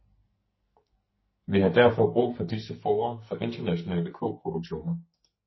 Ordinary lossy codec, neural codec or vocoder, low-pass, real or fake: MP3, 24 kbps; codec, 44.1 kHz, 2.6 kbps, SNAC; 7.2 kHz; fake